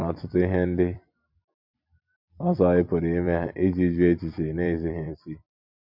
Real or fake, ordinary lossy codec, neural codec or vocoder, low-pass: fake; MP3, 48 kbps; vocoder, 44.1 kHz, 128 mel bands every 256 samples, BigVGAN v2; 5.4 kHz